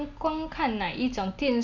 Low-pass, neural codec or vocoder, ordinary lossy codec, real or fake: 7.2 kHz; none; none; real